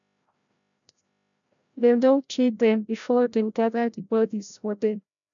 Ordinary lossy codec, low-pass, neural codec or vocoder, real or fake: none; 7.2 kHz; codec, 16 kHz, 0.5 kbps, FreqCodec, larger model; fake